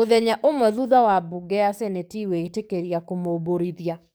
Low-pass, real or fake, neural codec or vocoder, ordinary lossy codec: none; fake; codec, 44.1 kHz, 7.8 kbps, DAC; none